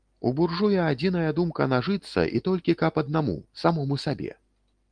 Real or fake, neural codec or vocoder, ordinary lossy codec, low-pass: real; none; Opus, 24 kbps; 9.9 kHz